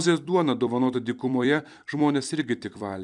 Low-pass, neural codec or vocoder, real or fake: 10.8 kHz; vocoder, 44.1 kHz, 128 mel bands every 256 samples, BigVGAN v2; fake